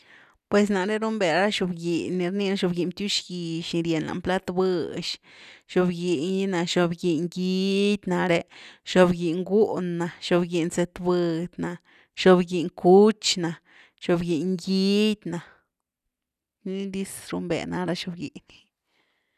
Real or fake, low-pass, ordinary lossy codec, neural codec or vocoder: real; 14.4 kHz; none; none